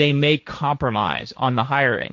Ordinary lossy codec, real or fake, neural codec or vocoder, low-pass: MP3, 48 kbps; fake; codec, 16 kHz, 1.1 kbps, Voila-Tokenizer; 7.2 kHz